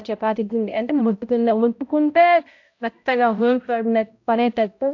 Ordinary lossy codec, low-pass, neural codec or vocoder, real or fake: AAC, 48 kbps; 7.2 kHz; codec, 16 kHz, 0.5 kbps, X-Codec, HuBERT features, trained on balanced general audio; fake